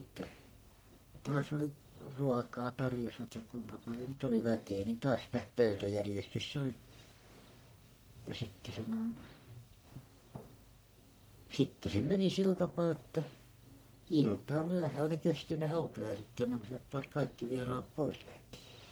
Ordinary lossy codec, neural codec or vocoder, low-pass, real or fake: none; codec, 44.1 kHz, 1.7 kbps, Pupu-Codec; none; fake